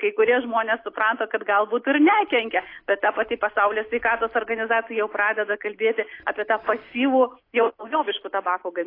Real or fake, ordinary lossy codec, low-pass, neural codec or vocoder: real; AAC, 32 kbps; 5.4 kHz; none